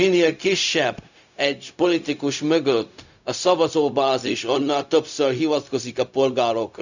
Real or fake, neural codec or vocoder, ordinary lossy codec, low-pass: fake; codec, 16 kHz, 0.4 kbps, LongCat-Audio-Codec; none; 7.2 kHz